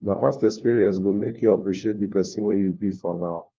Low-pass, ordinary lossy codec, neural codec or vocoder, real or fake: 7.2 kHz; Opus, 32 kbps; codec, 16 kHz, 1 kbps, FunCodec, trained on LibriTTS, 50 frames a second; fake